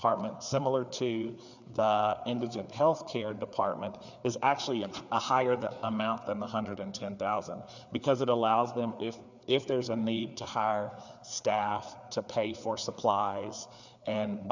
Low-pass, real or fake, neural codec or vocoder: 7.2 kHz; fake; codec, 16 kHz, 4 kbps, FreqCodec, larger model